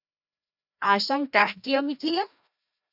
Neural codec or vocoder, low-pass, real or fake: codec, 16 kHz, 1 kbps, FreqCodec, larger model; 5.4 kHz; fake